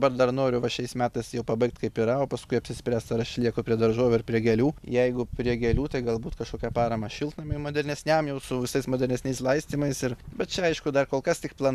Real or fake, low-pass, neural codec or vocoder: real; 14.4 kHz; none